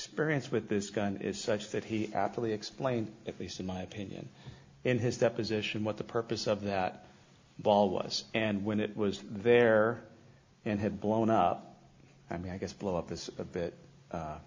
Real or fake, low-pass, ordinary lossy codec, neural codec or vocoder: real; 7.2 kHz; MP3, 48 kbps; none